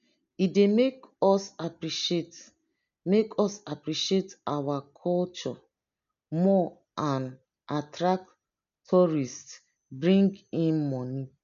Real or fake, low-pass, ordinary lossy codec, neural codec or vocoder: real; 7.2 kHz; none; none